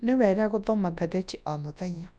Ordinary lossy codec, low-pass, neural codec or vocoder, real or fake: none; 9.9 kHz; codec, 24 kHz, 0.9 kbps, WavTokenizer, large speech release; fake